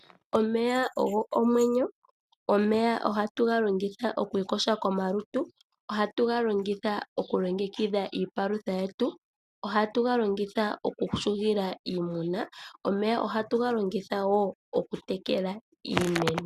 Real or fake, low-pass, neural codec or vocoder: real; 14.4 kHz; none